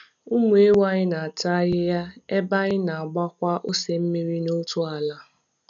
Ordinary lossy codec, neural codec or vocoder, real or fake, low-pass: none; none; real; 7.2 kHz